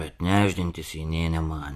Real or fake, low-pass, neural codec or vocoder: fake; 14.4 kHz; vocoder, 44.1 kHz, 128 mel bands every 512 samples, BigVGAN v2